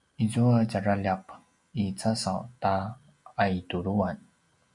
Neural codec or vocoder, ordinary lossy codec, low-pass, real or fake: none; MP3, 96 kbps; 10.8 kHz; real